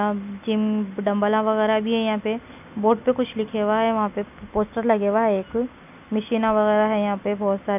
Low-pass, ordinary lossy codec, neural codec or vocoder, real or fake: 3.6 kHz; none; none; real